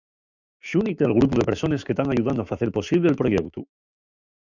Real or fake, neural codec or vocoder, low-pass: fake; autoencoder, 48 kHz, 128 numbers a frame, DAC-VAE, trained on Japanese speech; 7.2 kHz